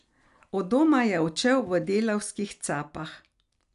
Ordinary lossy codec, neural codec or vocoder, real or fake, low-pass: none; none; real; 10.8 kHz